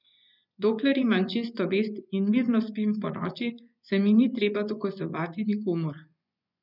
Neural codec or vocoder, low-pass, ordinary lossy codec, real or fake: vocoder, 24 kHz, 100 mel bands, Vocos; 5.4 kHz; none; fake